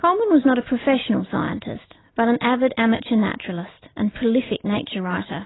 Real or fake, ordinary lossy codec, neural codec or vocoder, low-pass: real; AAC, 16 kbps; none; 7.2 kHz